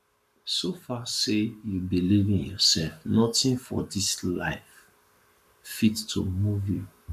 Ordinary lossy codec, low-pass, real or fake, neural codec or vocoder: none; 14.4 kHz; fake; codec, 44.1 kHz, 7.8 kbps, Pupu-Codec